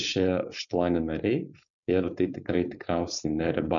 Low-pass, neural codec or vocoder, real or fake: 7.2 kHz; codec, 16 kHz, 4.8 kbps, FACodec; fake